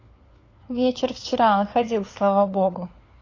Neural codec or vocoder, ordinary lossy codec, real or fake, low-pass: codec, 16 kHz, 4 kbps, FunCodec, trained on LibriTTS, 50 frames a second; AAC, 32 kbps; fake; 7.2 kHz